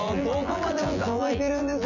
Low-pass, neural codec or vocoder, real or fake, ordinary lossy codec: 7.2 kHz; vocoder, 24 kHz, 100 mel bands, Vocos; fake; Opus, 64 kbps